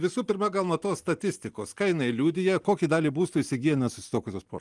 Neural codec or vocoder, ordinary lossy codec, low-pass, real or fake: none; Opus, 24 kbps; 10.8 kHz; real